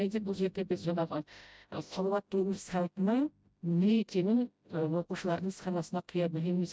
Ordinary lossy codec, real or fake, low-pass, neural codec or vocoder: none; fake; none; codec, 16 kHz, 0.5 kbps, FreqCodec, smaller model